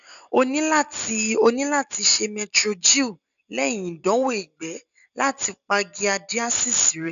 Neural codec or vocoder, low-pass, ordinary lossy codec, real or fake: none; 7.2 kHz; none; real